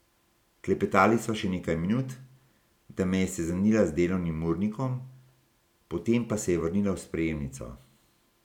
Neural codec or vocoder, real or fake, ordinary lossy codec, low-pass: none; real; none; 19.8 kHz